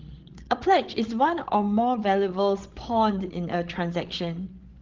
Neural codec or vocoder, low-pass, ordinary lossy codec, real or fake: codec, 16 kHz, 16 kbps, FreqCodec, larger model; 7.2 kHz; Opus, 16 kbps; fake